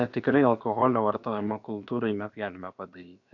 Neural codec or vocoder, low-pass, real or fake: codec, 16 kHz, about 1 kbps, DyCAST, with the encoder's durations; 7.2 kHz; fake